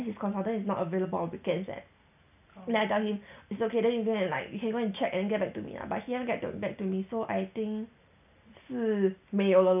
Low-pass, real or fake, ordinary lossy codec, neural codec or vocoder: 3.6 kHz; real; none; none